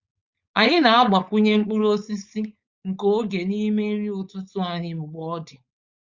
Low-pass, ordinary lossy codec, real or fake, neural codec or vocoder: 7.2 kHz; Opus, 64 kbps; fake; codec, 16 kHz, 4.8 kbps, FACodec